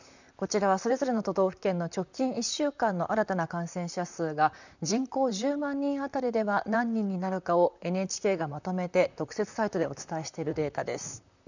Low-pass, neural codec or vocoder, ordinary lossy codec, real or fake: 7.2 kHz; vocoder, 44.1 kHz, 128 mel bands, Pupu-Vocoder; none; fake